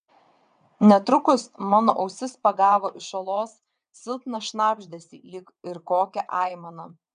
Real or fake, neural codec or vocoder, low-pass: fake; vocoder, 22.05 kHz, 80 mel bands, WaveNeXt; 9.9 kHz